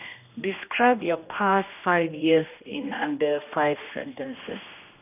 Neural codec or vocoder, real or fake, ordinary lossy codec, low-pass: codec, 16 kHz, 1 kbps, X-Codec, HuBERT features, trained on general audio; fake; none; 3.6 kHz